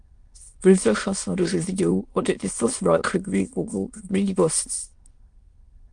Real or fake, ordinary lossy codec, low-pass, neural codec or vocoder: fake; Opus, 32 kbps; 9.9 kHz; autoencoder, 22.05 kHz, a latent of 192 numbers a frame, VITS, trained on many speakers